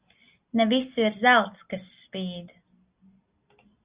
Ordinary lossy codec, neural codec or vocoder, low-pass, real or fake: Opus, 64 kbps; none; 3.6 kHz; real